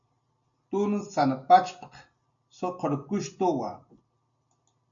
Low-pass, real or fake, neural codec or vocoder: 7.2 kHz; real; none